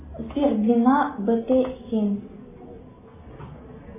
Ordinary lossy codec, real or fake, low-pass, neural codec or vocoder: MP3, 16 kbps; real; 3.6 kHz; none